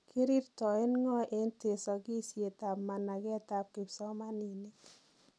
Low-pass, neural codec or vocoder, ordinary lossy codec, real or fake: none; none; none; real